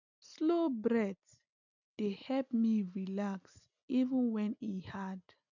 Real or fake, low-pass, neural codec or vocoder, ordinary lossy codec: real; 7.2 kHz; none; none